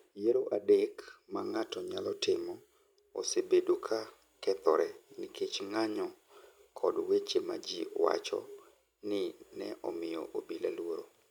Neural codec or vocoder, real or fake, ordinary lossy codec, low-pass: none; real; none; 19.8 kHz